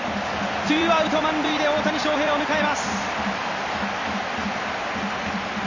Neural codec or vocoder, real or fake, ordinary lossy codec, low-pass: vocoder, 44.1 kHz, 128 mel bands every 256 samples, BigVGAN v2; fake; Opus, 64 kbps; 7.2 kHz